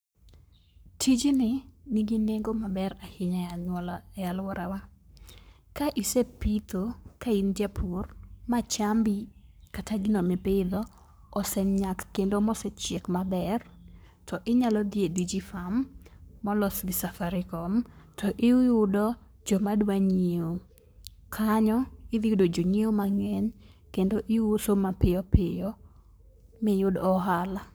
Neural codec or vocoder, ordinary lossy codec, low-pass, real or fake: codec, 44.1 kHz, 7.8 kbps, Pupu-Codec; none; none; fake